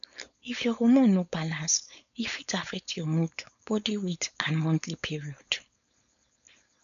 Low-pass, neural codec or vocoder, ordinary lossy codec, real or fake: 7.2 kHz; codec, 16 kHz, 4.8 kbps, FACodec; none; fake